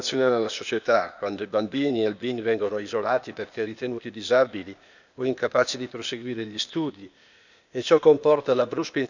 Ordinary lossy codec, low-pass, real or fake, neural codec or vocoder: none; 7.2 kHz; fake; codec, 16 kHz, 0.8 kbps, ZipCodec